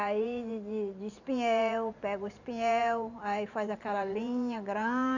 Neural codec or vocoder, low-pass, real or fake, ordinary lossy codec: vocoder, 44.1 kHz, 128 mel bands every 512 samples, BigVGAN v2; 7.2 kHz; fake; none